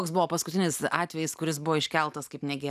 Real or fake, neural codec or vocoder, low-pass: real; none; 14.4 kHz